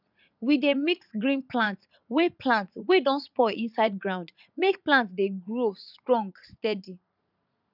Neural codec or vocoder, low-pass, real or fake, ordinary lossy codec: vocoder, 44.1 kHz, 80 mel bands, Vocos; 5.4 kHz; fake; none